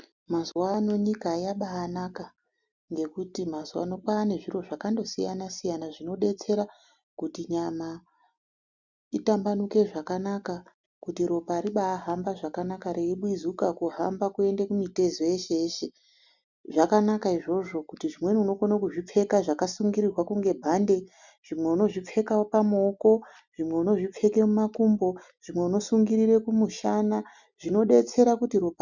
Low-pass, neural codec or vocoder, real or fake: 7.2 kHz; none; real